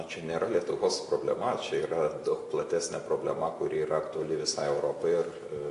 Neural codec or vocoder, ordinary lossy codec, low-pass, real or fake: none; AAC, 48 kbps; 10.8 kHz; real